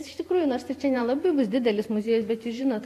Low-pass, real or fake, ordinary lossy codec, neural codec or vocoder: 14.4 kHz; fake; AAC, 64 kbps; vocoder, 44.1 kHz, 128 mel bands every 512 samples, BigVGAN v2